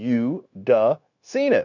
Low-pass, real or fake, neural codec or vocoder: 7.2 kHz; fake; codec, 16 kHz, 0.9 kbps, LongCat-Audio-Codec